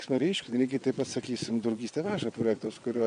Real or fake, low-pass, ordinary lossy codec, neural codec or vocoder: fake; 9.9 kHz; MP3, 64 kbps; vocoder, 22.05 kHz, 80 mel bands, WaveNeXt